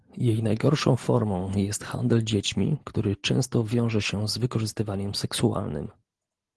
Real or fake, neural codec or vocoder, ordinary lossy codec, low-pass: real; none; Opus, 16 kbps; 10.8 kHz